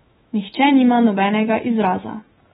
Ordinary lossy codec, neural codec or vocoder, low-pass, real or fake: AAC, 16 kbps; autoencoder, 48 kHz, 128 numbers a frame, DAC-VAE, trained on Japanese speech; 19.8 kHz; fake